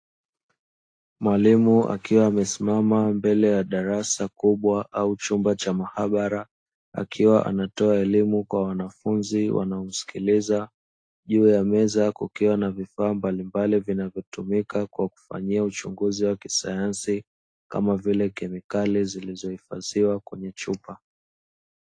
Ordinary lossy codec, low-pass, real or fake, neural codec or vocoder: AAC, 48 kbps; 9.9 kHz; real; none